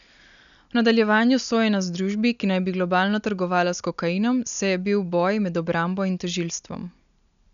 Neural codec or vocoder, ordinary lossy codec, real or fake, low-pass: none; none; real; 7.2 kHz